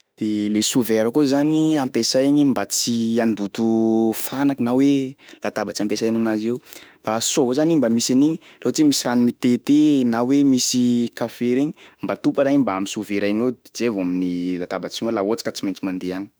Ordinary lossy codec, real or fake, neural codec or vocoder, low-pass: none; fake; autoencoder, 48 kHz, 32 numbers a frame, DAC-VAE, trained on Japanese speech; none